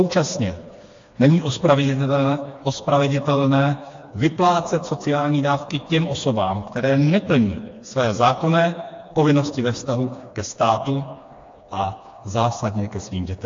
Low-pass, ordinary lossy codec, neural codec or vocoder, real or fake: 7.2 kHz; AAC, 48 kbps; codec, 16 kHz, 2 kbps, FreqCodec, smaller model; fake